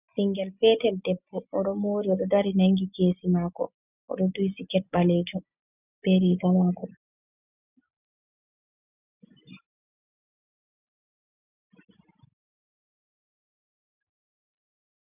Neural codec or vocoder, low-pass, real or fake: none; 3.6 kHz; real